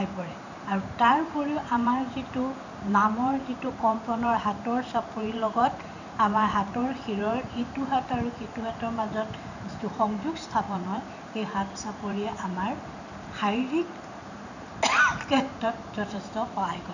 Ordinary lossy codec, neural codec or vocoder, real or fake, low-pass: none; vocoder, 44.1 kHz, 128 mel bands every 512 samples, BigVGAN v2; fake; 7.2 kHz